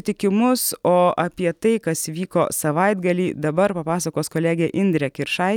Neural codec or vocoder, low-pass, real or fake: none; 19.8 kHz; real